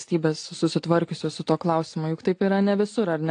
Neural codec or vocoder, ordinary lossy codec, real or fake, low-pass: none; AAC, 48 kbps; real; 9.9 kHz